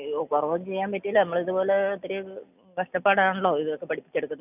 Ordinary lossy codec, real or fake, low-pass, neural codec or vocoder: none; real; 3.6 kHz; none